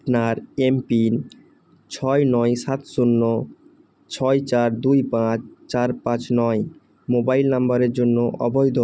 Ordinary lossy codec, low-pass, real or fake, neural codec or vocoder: none; none; real; none